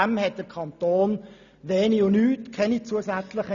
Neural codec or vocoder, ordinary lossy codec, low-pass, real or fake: none; none; 7.2 kHz; real